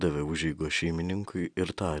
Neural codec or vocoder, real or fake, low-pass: none; real; 9.9 kHz